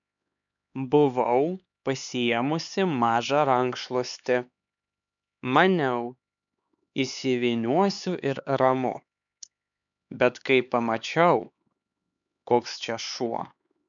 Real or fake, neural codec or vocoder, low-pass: fake; codec, 16 kHz, 4 kbps, X-Codec, HuBERT features, trained on LibriSpeech; 7.2 kHz